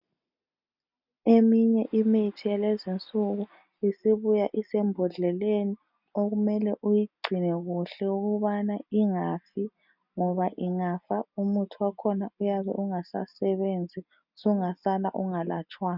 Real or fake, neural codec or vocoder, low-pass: real; none; 5.4 kHz